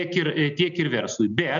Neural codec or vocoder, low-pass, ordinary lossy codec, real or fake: none; 7.2 kHz; MP3, 96 kbps; real